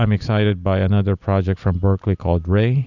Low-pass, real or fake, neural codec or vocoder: 7.2 kHz; real; none